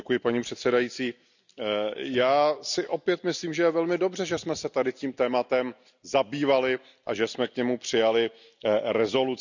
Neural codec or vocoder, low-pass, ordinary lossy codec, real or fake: none; 7.2 kHz; none; real